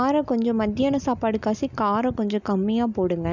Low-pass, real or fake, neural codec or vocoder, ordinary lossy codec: 7.2 kHz; real; none; none